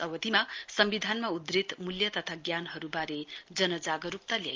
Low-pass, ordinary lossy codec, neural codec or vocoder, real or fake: 7.2 kHz; Opus, 24 kbps; none; real